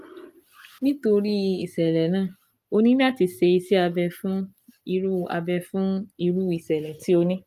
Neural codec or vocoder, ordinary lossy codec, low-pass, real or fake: none; Opus, 32 kbps; 14.4 kHz; real